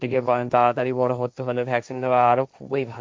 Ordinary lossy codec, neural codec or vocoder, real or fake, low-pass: none; codec, 16 kHz, 1.1 kbps, Voila-Tokenizer; fake; none